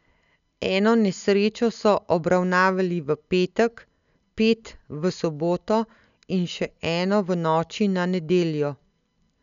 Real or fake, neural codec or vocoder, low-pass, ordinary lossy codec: real; none; 7.2 kHz; none